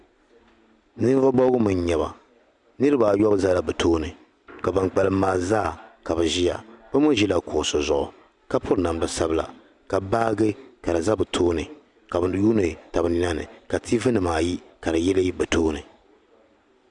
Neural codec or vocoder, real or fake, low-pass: none; real; 10.8 kHz